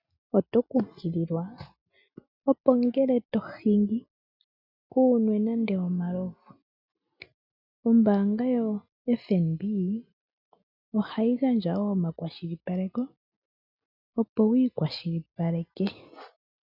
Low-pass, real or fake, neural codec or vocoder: 5.4 kHz; real; none